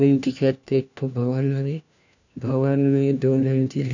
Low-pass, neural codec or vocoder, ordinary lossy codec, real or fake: 7.2 kHz; codec, 16 kHz, 1 kbps, FunCodec, trained on LibriTTS, 50 frames a second; none; fake